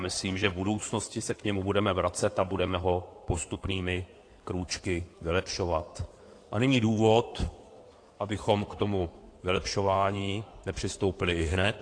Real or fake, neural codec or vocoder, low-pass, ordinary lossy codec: fake; codec, 16 kHz in and 24 kHz out, 2.2 kbps, FireRedTTS-2 codec; 9.9 kHz; AAC, 48 kbps